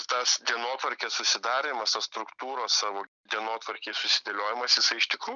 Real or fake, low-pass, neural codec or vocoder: real; 7.2 kHz; none